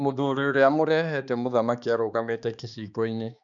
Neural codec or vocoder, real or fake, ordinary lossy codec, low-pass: codec, 16 kHz, 2 kbps, X-Codec, HuBERT features, trained on balanced general audio; fake; none; 7.2 kHz